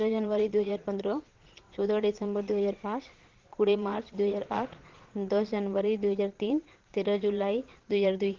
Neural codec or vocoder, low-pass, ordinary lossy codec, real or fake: vocoder, 22.05 kHz, 80 mel bands, Vocos; 7.2 kHz; Opus, 16 kbps; fake